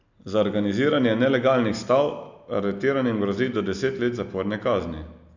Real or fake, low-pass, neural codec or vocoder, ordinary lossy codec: real; 7.2 kHz; none; none